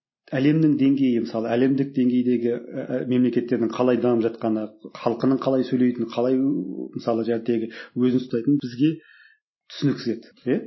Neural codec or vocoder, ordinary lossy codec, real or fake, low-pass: none; MP3, 24 kbps; real; 7.2 kHz